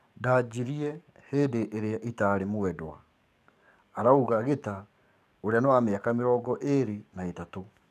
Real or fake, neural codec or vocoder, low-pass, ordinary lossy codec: fake; codec, 44.1 kHz, 7.8 kbps, DAC; 14.4 kHz; none